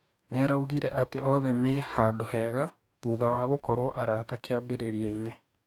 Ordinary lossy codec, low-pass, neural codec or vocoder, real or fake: none; 19.8 kHz; codec, 44.1 kHz, 2.6 kbps, DAC; fake